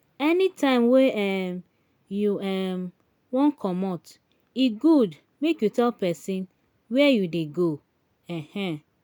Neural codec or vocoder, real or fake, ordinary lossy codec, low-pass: none; real; none; 19.8 kHz